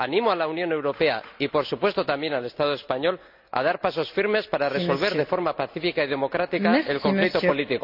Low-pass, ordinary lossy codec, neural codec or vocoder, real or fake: 5.4 kHz; none; none; real